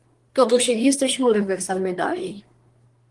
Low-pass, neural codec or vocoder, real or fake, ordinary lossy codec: 10.8 kHz; codec, 24 kHz, 1 kbps, SNAC; fake; Opus, 24 kbps